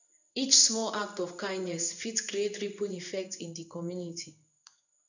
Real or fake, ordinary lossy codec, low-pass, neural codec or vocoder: fake; none; 7.2 kHz; codec, 16 kHz in and 24 kHz out, 1 kbps, XY-Tokenizer